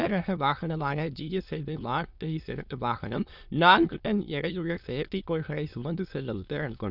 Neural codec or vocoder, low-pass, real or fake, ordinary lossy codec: autoencoder, 22.05 kHz, a latent of 192 numbers a frame, VITS, trained on many speakers; 5.4 kHz; fake; none